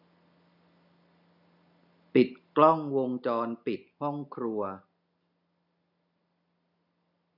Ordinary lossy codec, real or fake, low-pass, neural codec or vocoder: none; real; 5.4 kHz; none